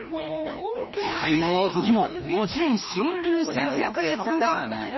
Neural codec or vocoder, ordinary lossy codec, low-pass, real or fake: codec, 16 kHz, 1 kbps, FreqCodec, larger model; MP3, 24 kbps; 7.2 kHz; fake